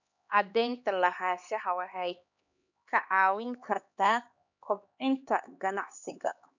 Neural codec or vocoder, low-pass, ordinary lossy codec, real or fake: codec, 16 kHz, 2 kbps, X-Codec, HuBERT features, trained on LibriSpeech; 7.2 kHz; none; fake